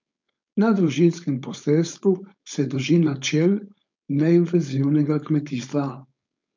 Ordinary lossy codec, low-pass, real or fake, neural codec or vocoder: MP3, 64 kbps; 7.2 kHz; fake; codec, 16 kHz, 4.8 kbps, FACodec